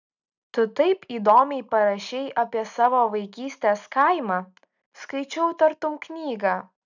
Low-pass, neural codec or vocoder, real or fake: 7.2 kHz; none; real